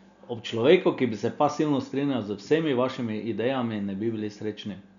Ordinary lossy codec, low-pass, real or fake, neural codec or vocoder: none; 7.2 kHz; real; none